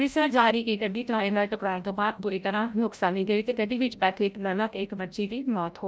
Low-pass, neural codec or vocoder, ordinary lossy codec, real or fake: none; codec, 16 kHz, 0.5 kbps, FreqCodec, larger model; none; fake